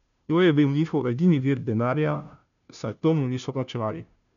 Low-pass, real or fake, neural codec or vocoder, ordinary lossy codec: 7.2 kHz; fake; codec, 16 kHz, 0.5 kbps, FunCodec, trained on Chinese and English, 25 frames a second; none